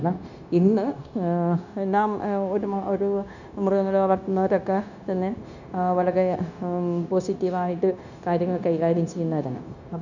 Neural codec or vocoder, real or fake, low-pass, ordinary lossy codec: codec, 16 kHz, 0.9 kbps, LongCat-Audio-Codec; fake; 7.2 kHz; none